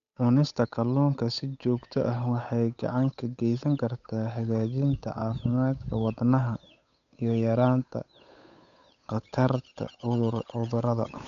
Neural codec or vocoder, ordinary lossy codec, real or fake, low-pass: codec, 16 kHz, 8 kbps, FunCodec, trained on Chinese and English, 25 frames a second; none; fake; 7.2 kHz